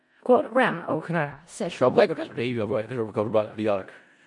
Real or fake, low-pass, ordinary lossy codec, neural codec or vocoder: fake; 10.8 kHz; MP3, 48 kbps; codec, 16 kHz in and 24 kHz out, 0.4 kbps, LongCat-Audio-Codec, four codebook decoder